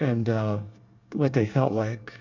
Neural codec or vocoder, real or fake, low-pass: codec, 24 kHz, 1 kbps, SNAC; fake; 7.2 kHz